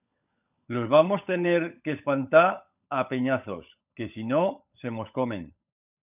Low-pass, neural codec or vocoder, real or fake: 3.6 kHz; codec, 16 kHz, 16 kbps, FunCodec, trained on LibriTTS, 50 frames a second; fake